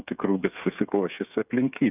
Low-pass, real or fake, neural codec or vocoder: 3.6 kHz; fake; codec, 16 kHz, 8 kbps, FreqCodec, smaller model